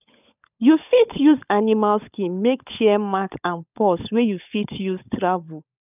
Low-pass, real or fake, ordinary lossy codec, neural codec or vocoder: 3.6 kHz; fake; none; codec, 16 kHz, 16 kbps, FunCodec, trained on LibriTTS, 50 frames a second